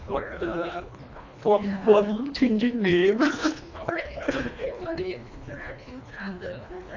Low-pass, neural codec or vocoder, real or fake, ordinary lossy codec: 7.2 kHz; codec, 24 kHz, 1.5 kbps, HILCodec; fake; AAC, 48 kbps